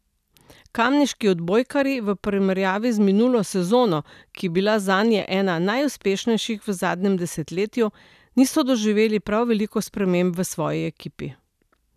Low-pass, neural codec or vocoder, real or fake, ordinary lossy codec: 14.4 kHz; none; real; none